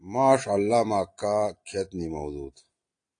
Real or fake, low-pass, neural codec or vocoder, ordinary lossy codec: real; 9.9 kHz; none; AAC, 48 kbps